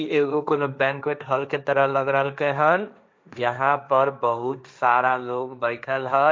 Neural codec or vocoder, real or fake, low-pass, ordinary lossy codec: codec, 16 kHz, 1.1 kbps, Voila-Tokenizer; fake; none; none